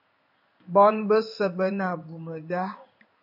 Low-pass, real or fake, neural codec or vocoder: 5.4 kHz; fake; codec, 16 kHz in and 24 kHz out, 1 kbps, XY-Tokenizer